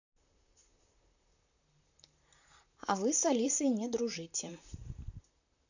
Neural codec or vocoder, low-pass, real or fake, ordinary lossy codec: vocoder, 44.1 kHz, 128 mel bands, Pupu-Vocoder; 7.2 kHz; fake; none